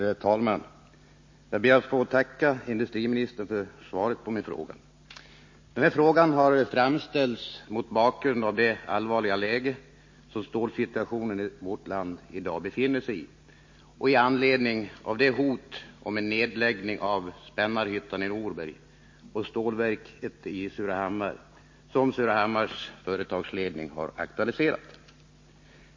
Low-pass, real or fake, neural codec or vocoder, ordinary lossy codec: 7.2 kHz; real; none; MP3, 32 kbps